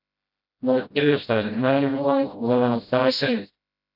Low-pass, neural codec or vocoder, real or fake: 5.4 kHz; codec, 16 kHz, 0.5 kbps, FreqCodec, smaller model; fake